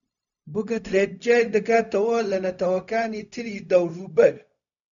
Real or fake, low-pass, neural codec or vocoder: fake; 7.2 kHz; codec, 16 kHz, 0.4 kbps, LongCat-Audio-Codec